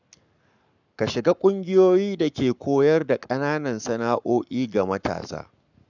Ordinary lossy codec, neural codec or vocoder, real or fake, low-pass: none; codec, 44.1 kHz, 7.8 kbps, Pupu-Codec; fake; 7.2 kHz